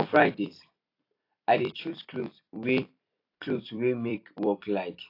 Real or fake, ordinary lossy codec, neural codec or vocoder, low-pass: fake; AAC, 32 kbps; vocoder, 44.1 kHz, 128 mel bands every 256 samples, BigVGAN v2; 5.4 kHz